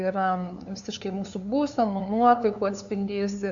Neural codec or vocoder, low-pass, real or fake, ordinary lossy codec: codec, 16 kHz, 2 kbps, FunCodec, trained on LibriTTS, 25 frames a second; 7.2 kHz; fake; AAC, 64 kbps